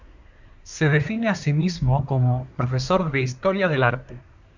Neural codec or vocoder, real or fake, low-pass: codec, 24 kHz, 1 kbps, SNAC; fake; 7.2 kHz